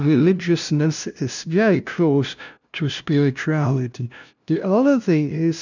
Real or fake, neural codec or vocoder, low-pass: fake; codec, 16 kHz, 0.5 kbps, FunCodec, trained on LibriTTS, 25 frames a second; 7.2 kHz